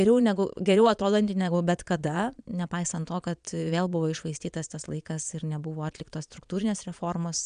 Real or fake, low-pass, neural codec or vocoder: fake; 9.9 kHz; vocoder, 22.05 kHz, 80 mel bands, Vocos